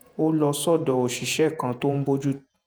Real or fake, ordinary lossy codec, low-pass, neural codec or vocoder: fake; none; none; vocoder, 48 kHz, 128 mel bands, Vocos